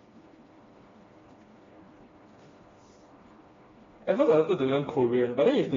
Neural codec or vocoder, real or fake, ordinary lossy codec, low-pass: codec, 16 kHz, 2 kbps, FreqCodec, smaller model; fake; AAC, 24 kbps; 7.2 kHz